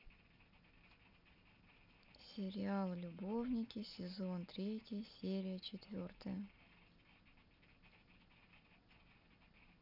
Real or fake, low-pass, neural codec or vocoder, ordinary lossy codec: real; 5.4 kHz; none; none